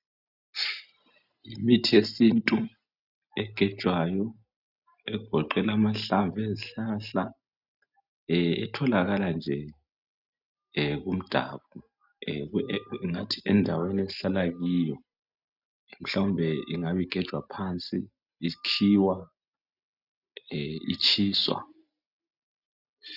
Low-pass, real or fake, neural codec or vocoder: 5.4 kHz; real; none